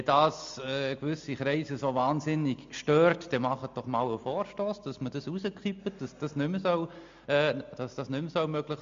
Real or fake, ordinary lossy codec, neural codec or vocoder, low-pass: real; MP3, 48 kbps; none; 7.2 kHz